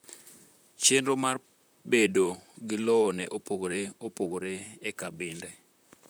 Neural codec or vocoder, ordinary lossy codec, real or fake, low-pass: vocoder, 44.1 kHz, 128 mel bands, Pupu-Vocoder; none; fake; none